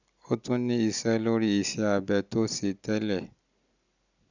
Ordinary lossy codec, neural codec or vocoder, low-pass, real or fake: none; none; 7.2 kHz; real